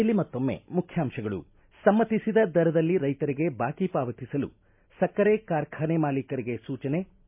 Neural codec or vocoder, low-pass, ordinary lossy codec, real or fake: none; 3.6 kHz; MP3, 32 kbps; real